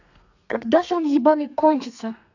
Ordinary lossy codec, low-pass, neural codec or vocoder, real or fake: none; 7.2 kHz; codec, 32 kHz, 1.9 kbps, SNAC; fake